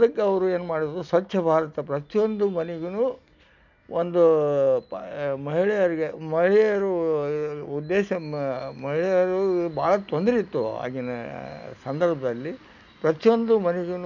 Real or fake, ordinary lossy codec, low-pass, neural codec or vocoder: real; none; 7.2 kHz; none